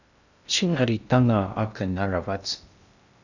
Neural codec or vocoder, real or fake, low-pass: codec, 16 kHz in and 24 kHz out, 0.6 kbps, FocalCodec, streaming, 2048 codes; fake; 7.2 kHz